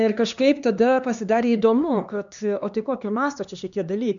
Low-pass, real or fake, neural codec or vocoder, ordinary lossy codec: 7.2 kHz; fake; codec, 16 kHz, 2 kbps, X-Codec, HuBERT features, trained on LibriSpeech; MP3, 96 kbps